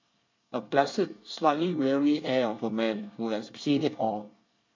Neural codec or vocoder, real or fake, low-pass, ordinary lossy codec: codec, 24 kHz, 1 kbps, SNAC; fake; 7.2 kHz; MP3, 48 kbps